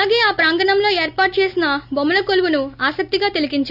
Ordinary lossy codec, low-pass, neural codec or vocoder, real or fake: none; 5.4 kHz; none; real